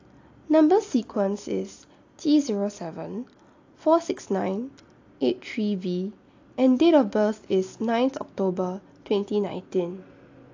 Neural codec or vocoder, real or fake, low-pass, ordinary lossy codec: none; real; 7.2 kHz; AAC, 48 kbps